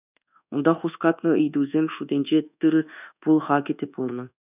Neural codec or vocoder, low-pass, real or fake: codec, 24 kHz, 1.2 kbps, DualCodec; 3.6 kHz; fake